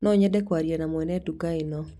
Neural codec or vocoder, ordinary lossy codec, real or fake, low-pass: none; none; real; 14.4 kHz